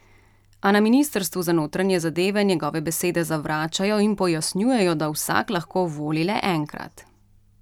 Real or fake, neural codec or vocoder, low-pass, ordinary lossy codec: real; none; 19.8 kHz; none